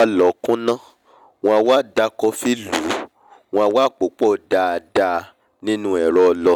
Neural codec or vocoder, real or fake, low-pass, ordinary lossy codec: none; real; 19.8 kHz; none